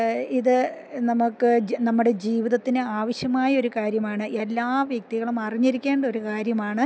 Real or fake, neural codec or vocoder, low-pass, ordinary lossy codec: real; none; none; none